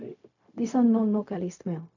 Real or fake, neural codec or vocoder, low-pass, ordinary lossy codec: fake; codec, 16 kHz, 0.4 kbps, LongCat-Audio-Codec; 7.2 kHz; none